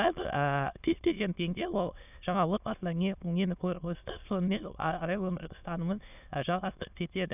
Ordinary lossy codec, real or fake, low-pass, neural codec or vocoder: none; fake; 3.6 kHz; autoencoder, 22.05 kHz, a latent of 192 numbers a frame, VITS, trained on many speakers